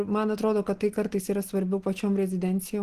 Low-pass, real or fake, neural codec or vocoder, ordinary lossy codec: 14.4 kHz; real; none; Opus, 16 kbps